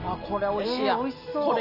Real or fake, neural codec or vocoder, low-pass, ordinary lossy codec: real; none; 5.4 kHz; none